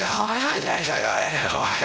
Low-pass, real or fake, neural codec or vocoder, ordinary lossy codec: none; fake; codec, 16 kHz, 0.5 kbps, X-Codec, WavLM features, trained on Multilingual LibriSpeech; none